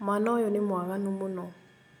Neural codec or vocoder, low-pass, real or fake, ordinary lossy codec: none; none; real; none